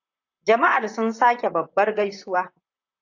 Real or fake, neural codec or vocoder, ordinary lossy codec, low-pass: fake; vocoder, 44.1 kHz, 128 mel bands, Pupu-Vocoder; AAC, 48 kbps; 7.2 kHz